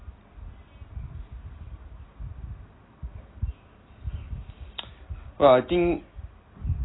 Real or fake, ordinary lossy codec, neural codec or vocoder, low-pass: real; AAC, 16 kbps; none; 7.2 kHz